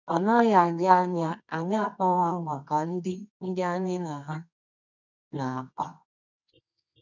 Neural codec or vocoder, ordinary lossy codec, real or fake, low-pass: codec, 24 kHz, 0.9 kbps, WavTokenizer, medium music audio release; none; fake; 7.2 kHz